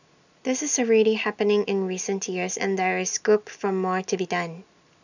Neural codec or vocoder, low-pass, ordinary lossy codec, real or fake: none; 7.2 kHz; none; real